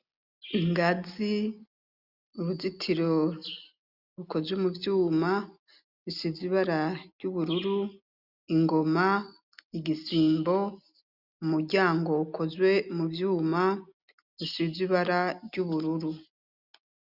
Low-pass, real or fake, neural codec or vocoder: 5.4 kHz; real; none